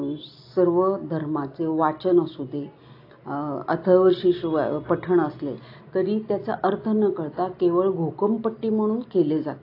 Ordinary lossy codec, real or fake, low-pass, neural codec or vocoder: AAC, 32 kbps; real; 5.4 kHz; none